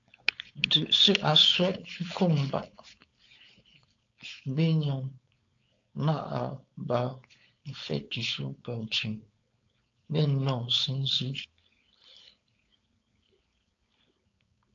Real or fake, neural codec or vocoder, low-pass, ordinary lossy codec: fake; codec, 16 kHz, 4.8 kbps, FACodec; 7.2 kHz; AAC, 48 kbps